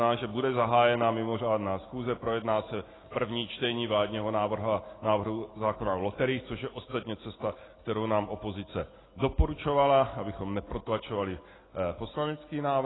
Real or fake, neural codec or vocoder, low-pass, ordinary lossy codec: real; none; 7.2 kHz; AAC, 16 kbps